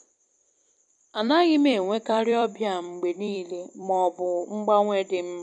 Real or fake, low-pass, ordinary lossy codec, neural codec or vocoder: fake; 10.8 kHz; none; vocoder, 44.1 kHz, 128 mel bands every 256 samples, BigVGAN v2